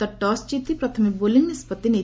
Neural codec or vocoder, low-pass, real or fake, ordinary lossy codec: none; none; real; none